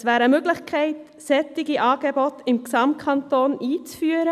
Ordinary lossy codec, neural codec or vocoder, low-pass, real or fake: none; none; 14.4 kHz; real